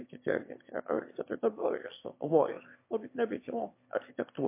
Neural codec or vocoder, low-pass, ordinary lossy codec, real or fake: autoencoder, 22.05 kHz, a latent of 192 numbers a frame, VITS, trained on one speaker; 3.6 kHz; MP3, 24 kbps; fake